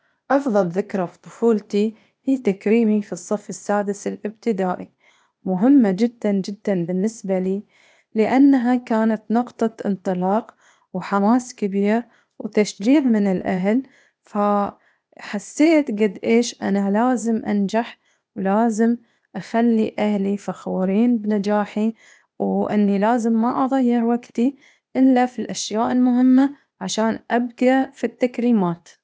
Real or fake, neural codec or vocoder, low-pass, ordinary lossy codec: fake; codec, 16 kHz, 0.8 kbps, ZipCodec; none; none